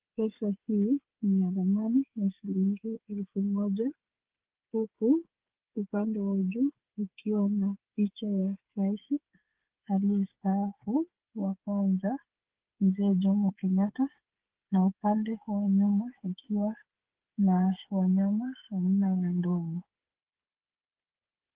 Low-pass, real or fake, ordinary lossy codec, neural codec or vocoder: 3.6 kHz; fake; Opus, 16 kbps; codec, 16 kHz, 8 kbps, FreqCodec, smaller model